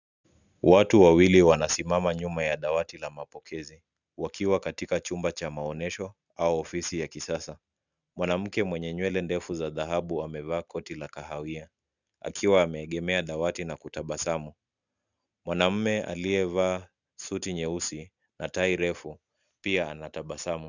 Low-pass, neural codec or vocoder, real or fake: 7.2 kHz; none; real